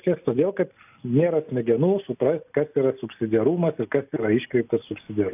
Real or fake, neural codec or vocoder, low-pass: real; none; 3.6 kHz